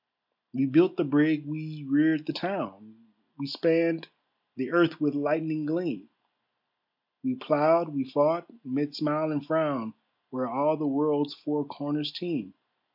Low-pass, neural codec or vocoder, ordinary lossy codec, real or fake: 5.4 kHz; none; MP3, 48 kbps; real